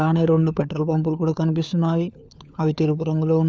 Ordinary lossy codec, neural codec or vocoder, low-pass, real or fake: none; codec, 16 kHz, 4 kbps, FunCodec, trained on LibriTTS, 50 frames a second; none; fake